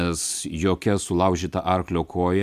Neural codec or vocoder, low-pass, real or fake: none; 14.4 kHz; real